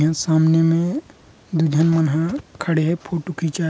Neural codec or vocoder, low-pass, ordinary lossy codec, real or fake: none; none; none; real